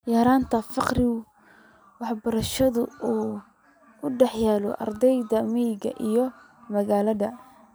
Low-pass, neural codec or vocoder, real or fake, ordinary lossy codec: none; none; real; none